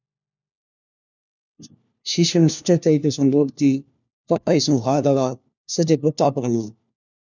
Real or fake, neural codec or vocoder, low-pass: fake; codec, 16 kHz, 1 kbps, FunCodec, trained on LibriTTS, 50 frames a second; 7.2 kHz